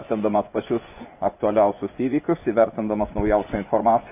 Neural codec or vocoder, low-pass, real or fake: vocoder, 24 kHz, 100 mel bands, Vocos; 3.6 kHz; fake